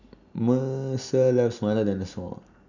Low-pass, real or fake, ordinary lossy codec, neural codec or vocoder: 7.2 kHz; real; none; none